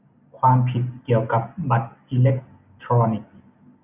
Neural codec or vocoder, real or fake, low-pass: none; real; 3.6 kHz